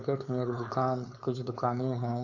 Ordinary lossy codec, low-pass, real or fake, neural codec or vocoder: none; 7.2 kHz; fake; codec, 16 kHz, 4.8 kbps, FACodec